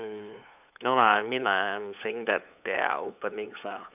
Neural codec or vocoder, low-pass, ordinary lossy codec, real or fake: codec, 16 kHz, 8 kbps, FunCodec, trained on LibriTTS, 25 frames a second; 3.6 kHz; none; fake